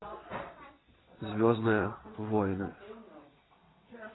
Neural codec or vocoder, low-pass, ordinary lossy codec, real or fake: vocoder, 22.05 kHz, 80 mel bands, WaveNeXt; 7.2 kHz; AAC, 16 kbps; fake